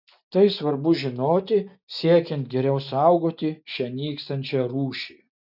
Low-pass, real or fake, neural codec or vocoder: 5.4 kHz; real; none